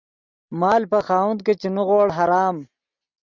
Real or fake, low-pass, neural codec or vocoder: real; 7.2 kHz; none